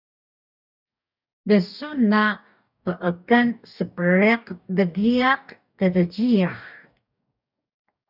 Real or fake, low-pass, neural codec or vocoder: fake; 5.4 kHz; codec, 44.1 kHz, 2.6 kbps, DAC